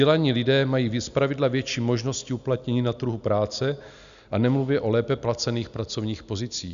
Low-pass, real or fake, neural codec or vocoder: 7.2 kHz; real; none